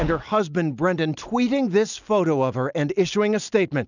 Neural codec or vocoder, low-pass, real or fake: none; 7.2 kHz; real